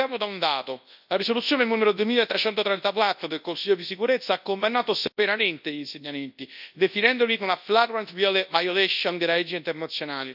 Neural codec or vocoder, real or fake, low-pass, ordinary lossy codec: codec, 24 kHz, 0.9 kbps, WavTokenizer, large speech release; fake; 5.4 kHz; AAC, 48 kbps